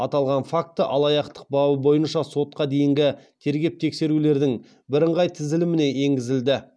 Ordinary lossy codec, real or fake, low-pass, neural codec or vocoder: none; real; none; none